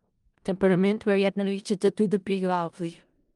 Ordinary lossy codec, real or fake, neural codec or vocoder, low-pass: Opus, 32 kbps; fake; codec, 16 kHz in and 24 kHz out, 0.4 kbps, LongCat-Audio-Codec, four codebook decoder; 10.8 kHz